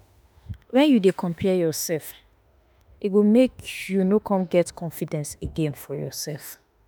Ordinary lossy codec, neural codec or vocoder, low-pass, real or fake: none; autoencoder, 48 kHz, 32 numbers a frame, DAC-VAE, trained on Japanese speech; none; fake